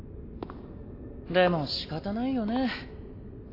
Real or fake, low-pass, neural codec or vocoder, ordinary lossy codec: real; 5.4 kHz; none; AAC, 32 kbps